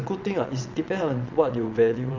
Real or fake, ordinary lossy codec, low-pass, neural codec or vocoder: fake; none; 7.2 kHz; vocoder, 22.05 kHz, 80 mel bands, WaveNeXt